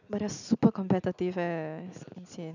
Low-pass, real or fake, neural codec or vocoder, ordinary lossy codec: 7.2 kHz; real; none; none